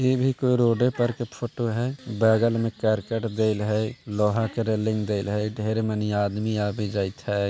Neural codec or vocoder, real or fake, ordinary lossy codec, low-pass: none; real; none; none